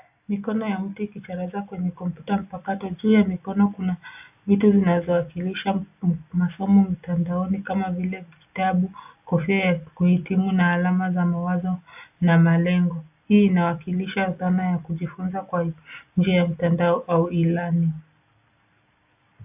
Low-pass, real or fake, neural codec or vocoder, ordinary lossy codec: 3.6 kHz; real; none; AAC, 32 kbps